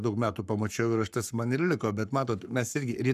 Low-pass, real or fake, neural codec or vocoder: 14.4 kHz; fake; codec, 44.1 kHz, 7.8 kbps, Pupu-Codec